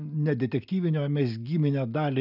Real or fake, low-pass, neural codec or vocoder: real; 5.4 kHz; none